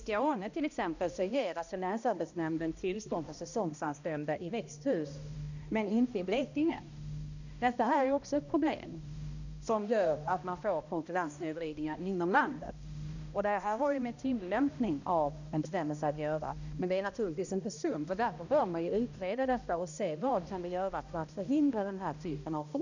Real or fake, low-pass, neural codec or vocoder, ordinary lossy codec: fake; 7.2 kHz; codec, 16 kHz, 1 kbps, X-Codec, HuBERT features, trained on balanced general audio; none